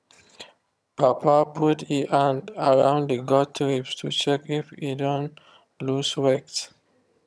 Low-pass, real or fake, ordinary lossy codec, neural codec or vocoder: none; fake; none; vocoder, 22.05 kHz, 80 mel bands, HiFi-GAN